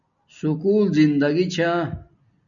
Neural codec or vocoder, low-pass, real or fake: none; 7.2 kHz; real